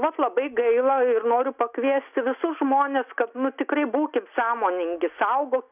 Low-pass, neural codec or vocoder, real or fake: 3.6 kHz; none; real